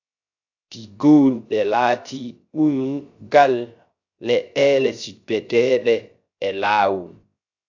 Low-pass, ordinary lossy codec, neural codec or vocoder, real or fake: 7.2 kHz; AAC, 48 kbps; codec, 16 kHz, 0.3 kbps, FocalCodec; fake